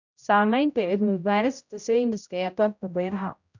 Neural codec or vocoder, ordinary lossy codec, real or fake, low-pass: codec, 16 kHz, 0.5 kbps, X-Codec, HuBERT features, trained on general audio; none; fake; 7.2 kHz